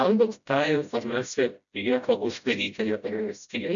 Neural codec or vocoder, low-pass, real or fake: codec, 16 kHz, 0.5 kbps, FreqCodec, smaller model; 7.2 kHz; fake